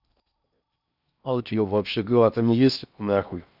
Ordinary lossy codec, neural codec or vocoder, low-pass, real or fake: none; codec, 16 kHz in and 24 kHz out, 0.6 kbps, FocalCodec, streaming, 4096 codes; 5.4 kHz; fake